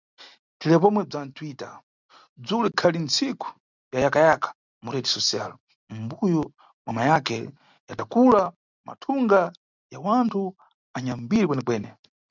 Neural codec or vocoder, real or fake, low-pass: none; real; 7.2 kHz